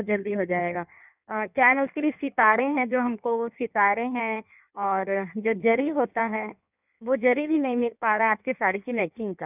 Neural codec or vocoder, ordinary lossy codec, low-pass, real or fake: codec, 16 kHz in and 24 kHz out, 1.1 kbps, FireRedTTS-2 codec; none; 3.6 kHz; fake